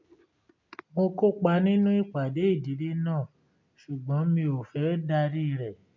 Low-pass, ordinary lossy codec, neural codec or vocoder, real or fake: 7.2 kHz; MP3, 64 kbps; none; real